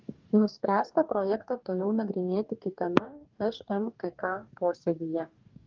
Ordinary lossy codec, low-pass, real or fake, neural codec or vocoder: Opus, 24 kbps; 7.2 kHz; fake; codec, 44.1 kHz, 2.6 kbps, DAC